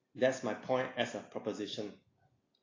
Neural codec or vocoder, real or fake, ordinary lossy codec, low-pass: none; real; AAC, 32 kbps; 7.2 kHz